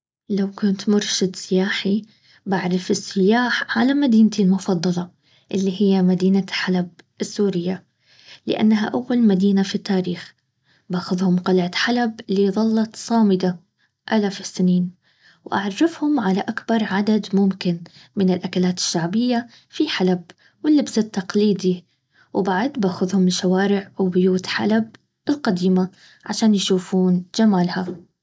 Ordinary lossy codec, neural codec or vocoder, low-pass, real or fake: none; none; none; real